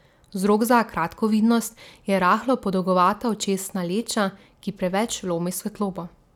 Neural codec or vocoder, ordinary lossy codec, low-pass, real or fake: none; none; 19.8 kHz; real